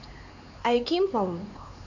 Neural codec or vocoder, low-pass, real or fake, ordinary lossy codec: codec, 16 kHz, 4 kbps, X-Codec, HuBERT features, trained on LibriSpeech; 7.2 kHz; fake; none